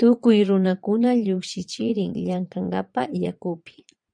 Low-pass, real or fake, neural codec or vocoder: 9.9 kHz; fake; vocoder, 22.05 kHz, 80 mel bands, Vocos